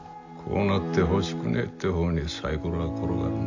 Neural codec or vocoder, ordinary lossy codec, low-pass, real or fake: none; AAC, 48 kbps; 7.2 kHz; real